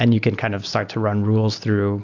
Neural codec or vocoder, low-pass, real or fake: none; 7.2 kHz; real